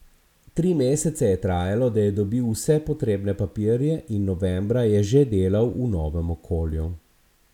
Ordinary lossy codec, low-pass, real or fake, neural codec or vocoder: none; 19.8 kHz; real; none